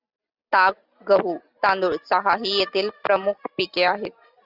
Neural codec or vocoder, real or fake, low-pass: none; real; 5.4 kHz